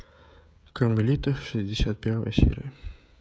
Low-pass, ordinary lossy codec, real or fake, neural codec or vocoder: none; none; fake; codec, 16 kHz, 16 kbps, FreqCodec, smaller model